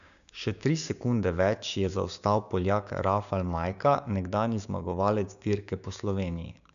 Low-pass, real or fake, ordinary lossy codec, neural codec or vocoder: 7.2 kHz; fake; none; codec, 16 kHz, 6 kbps, DAC